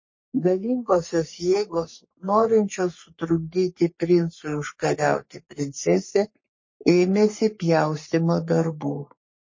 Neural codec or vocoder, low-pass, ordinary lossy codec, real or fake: codec, 44.1 kHz, 3.4 kbps, Pupu-Codec; 7.2 kHz; MP3, 32 kbps; fake